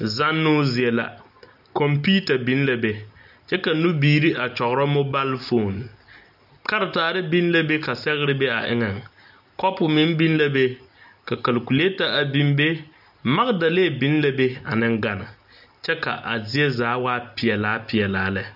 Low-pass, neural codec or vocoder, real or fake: 5.4 kHz; none; real